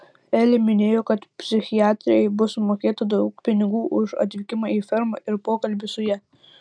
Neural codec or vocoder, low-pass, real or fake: none; 9.9 kHz; real